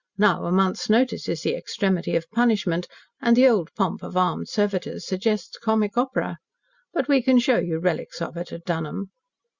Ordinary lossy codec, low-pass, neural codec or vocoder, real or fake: Opus, 64 kbps; 7.2 kHz; none; real